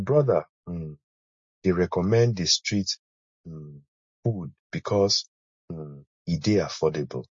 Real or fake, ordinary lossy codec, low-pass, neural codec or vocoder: real; MP3, 32 kbps; 7.2 kHz; none